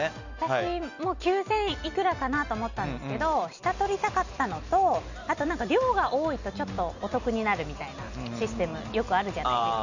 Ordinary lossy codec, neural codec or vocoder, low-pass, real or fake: none; none; 7.2 kHz; real